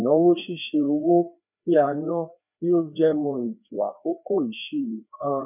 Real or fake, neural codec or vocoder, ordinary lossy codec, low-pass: fake; codec, 16 kHz, 2 kbps, FreqCodec, larger model; none; 3.6 kHz